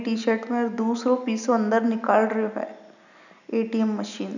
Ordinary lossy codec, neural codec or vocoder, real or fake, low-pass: none; none; real; 7.2 kHz